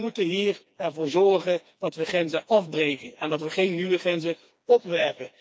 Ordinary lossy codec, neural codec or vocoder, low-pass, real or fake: none; codec, 16 kHz, 2 kbps, FreqCodec, smaller model; none; fake